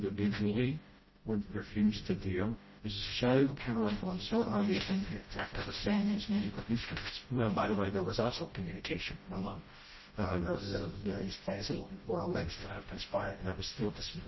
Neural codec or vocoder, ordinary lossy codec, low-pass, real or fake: codec, 16 kHz, 0.5 kbps, FreqCodec, smaller model; MP3, 24 kbps; 7.2 kHz; fake